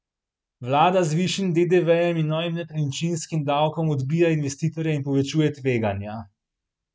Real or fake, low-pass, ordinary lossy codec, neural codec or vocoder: real; none; none; none